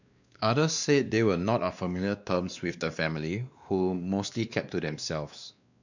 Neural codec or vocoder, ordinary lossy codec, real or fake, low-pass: codec, 16 kHz, 2 kbps, X-Codec, WavLM features, trained on Multilingual LibriSpeech; none; fake; 7.2 kHz